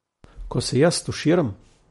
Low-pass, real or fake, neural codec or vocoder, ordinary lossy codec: 19.8 kHz; real; none; MP3, 48 kbps